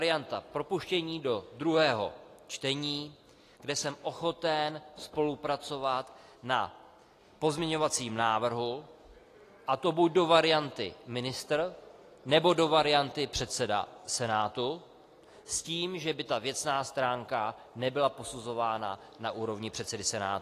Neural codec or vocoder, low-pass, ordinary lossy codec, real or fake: none; 14.4 kHz; AAC, 48 kbps; real